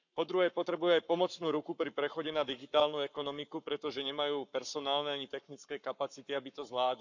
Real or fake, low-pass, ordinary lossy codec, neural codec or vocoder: fake; 7.2 kHz; none; codec, 44.1 kHz, 7.8 kbps, Pupu-Codec